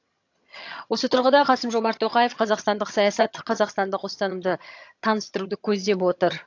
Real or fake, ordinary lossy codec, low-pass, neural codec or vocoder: fake; AAC, 48 kbps; 7.2 kHz; vocoder, 22.05 kHz, 80 mel bands, HiFi-GAN